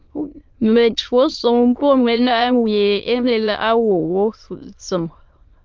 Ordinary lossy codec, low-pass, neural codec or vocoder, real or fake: Opus, 24 kbps; 7.2 kHz; autoencoder, 22.05 kHz, a latent of 192 numbers a frame, VITS, trained on many speakers; fake